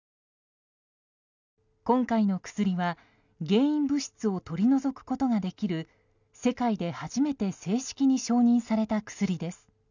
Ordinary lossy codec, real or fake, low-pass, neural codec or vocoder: none; real; 7.2 kHz; none